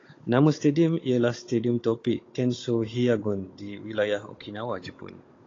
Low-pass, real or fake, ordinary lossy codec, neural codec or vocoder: 7.2 kHz; fake; AAC, 32 kbps; codec, 16 kHz, 16 kbps, FunCodec, trained on Chinese and English, 50 frames a second